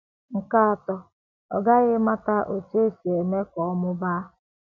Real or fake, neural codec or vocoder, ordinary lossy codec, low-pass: real; none; none; 7.2 kHz